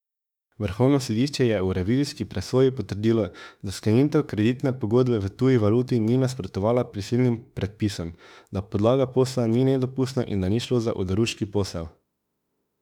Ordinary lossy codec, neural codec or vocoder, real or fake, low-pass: none; autoencoder, 48 kHz, 32 numbers a frame, DAC-VAE, trained on Japanese speech; fake; 19.8 kHz